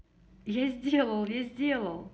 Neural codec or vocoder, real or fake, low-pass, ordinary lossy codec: none; real; none; none